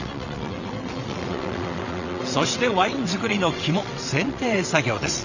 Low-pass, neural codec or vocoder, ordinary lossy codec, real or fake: 7.2 kHz; vocoder, 22.05 kHz, 80 mel bands, WaveNeXt; none; fake